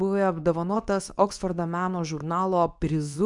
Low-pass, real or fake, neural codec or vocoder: 10.8 kHz; fake; codec, 24 kHz, 0.9 kbps, WavTokenizer, medium speech release version 2